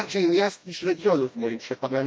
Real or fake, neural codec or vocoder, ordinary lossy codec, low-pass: fake; codec, 16 kHz, 1 kbps, FreqCodec, smaller model; none; none